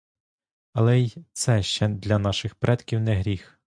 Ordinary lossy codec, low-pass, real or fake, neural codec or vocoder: MP3, 96 kbps; 9.9 kHz; real; none